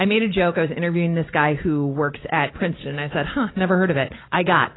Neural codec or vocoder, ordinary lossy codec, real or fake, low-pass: none; AAC, 16 kbps; real; 7.2 kHz